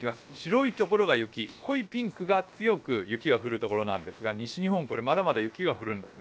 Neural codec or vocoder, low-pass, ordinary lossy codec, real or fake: codec, 16 kHz, about 1 kbps, DyCAST, with the encoder's durations; none; none; fake